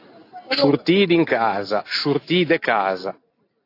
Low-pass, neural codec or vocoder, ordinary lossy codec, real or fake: 5.4 kHz; none; AAC, 32 kbps; real